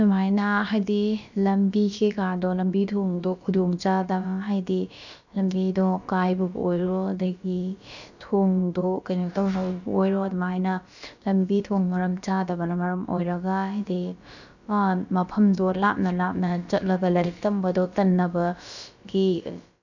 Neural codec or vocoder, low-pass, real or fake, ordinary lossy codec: codec, 16 kHz, about 1 kbps, DyCAST, with the encoder's durations; 7.2 kHz; fake; none